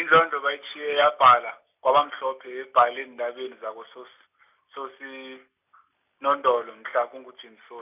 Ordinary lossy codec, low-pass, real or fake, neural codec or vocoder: none; 3.6 kHz; real; none